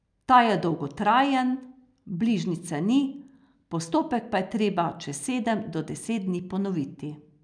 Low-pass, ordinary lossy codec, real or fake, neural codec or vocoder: 9.9 kHz; none; real; none